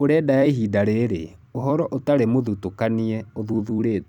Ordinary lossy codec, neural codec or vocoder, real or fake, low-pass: none; vocoder, 44.1 kHz, 128 mel bands every 256 samples, BigVGAN v2; fake; 19.8 kHz